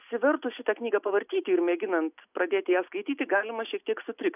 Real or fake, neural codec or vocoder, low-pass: real; none; 3.6 kHz